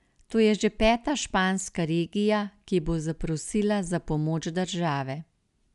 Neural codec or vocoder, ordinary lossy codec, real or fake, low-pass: none; none; real; 10.8 kHz